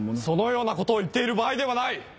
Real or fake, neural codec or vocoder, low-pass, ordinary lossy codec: real; none; none; none